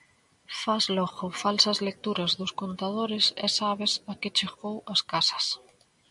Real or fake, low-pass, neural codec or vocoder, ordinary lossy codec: real; 10.8 kHz; none; MP3, 96 kbps